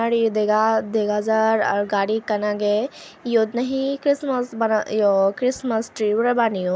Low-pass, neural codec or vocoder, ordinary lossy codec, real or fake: none; none; none; real